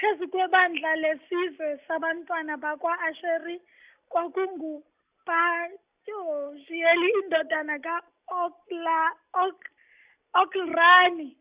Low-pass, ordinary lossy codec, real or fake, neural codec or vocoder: 3.6 kHz; Opus, 64 kbps; real; none